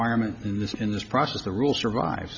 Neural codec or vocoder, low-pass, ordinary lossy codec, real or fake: none; 7.2 kHz; MP3, 64 kbps; real